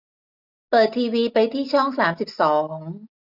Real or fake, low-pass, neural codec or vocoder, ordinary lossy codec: real; 5.4 kHz; none; none